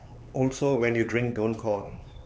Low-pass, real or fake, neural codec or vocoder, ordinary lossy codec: none; fake; codec, 16 kHz, 4 kbps, X-Codec, HuBERT features, trained on LibriSpeech; none